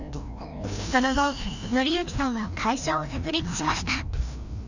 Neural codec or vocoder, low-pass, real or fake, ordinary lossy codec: codec, 16 kHz, 1 kbps, FreqCodec, larger model; 7.2 kHz; fake; none